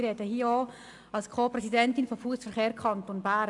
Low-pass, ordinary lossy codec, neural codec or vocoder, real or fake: 10.8 kHz; AAC, 64 kbps; none; real